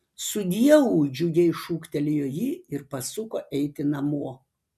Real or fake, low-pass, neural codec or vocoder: real; 14.4 kHz; none